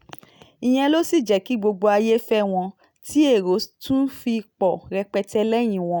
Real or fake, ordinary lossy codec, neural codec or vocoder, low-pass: real; none; none; none